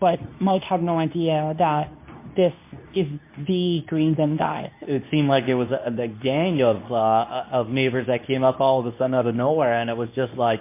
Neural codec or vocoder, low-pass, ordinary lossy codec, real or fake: codec, 24 kHz, 0.9 kbps, WavTokenizer, medium speech release version 2; 3.6 kHz; MP3, 24 kbps; fake